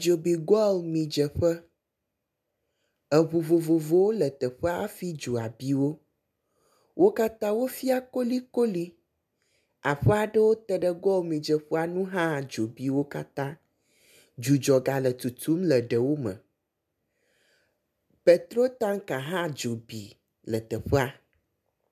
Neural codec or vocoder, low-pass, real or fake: none; 14.4 kHz; real